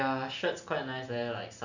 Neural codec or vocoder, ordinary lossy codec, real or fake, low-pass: none; none; real; 7.2 kHz